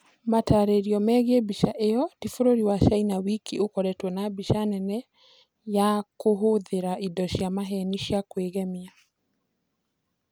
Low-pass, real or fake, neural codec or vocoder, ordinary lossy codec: none; real; none; none